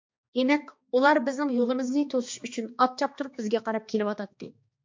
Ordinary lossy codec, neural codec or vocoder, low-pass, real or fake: MP3, 48 kbps; codec, 16 kHz, 2 kbps, X-Codec, HuBERT features, trained on balanced general audio; 7.2 kHz; fake